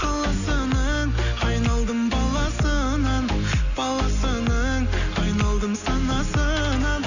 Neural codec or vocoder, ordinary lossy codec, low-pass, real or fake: none; none; 7.2 kHz; real